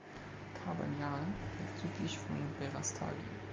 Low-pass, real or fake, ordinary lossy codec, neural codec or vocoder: 7.2 kHz; real; Opus, 32 kbps; none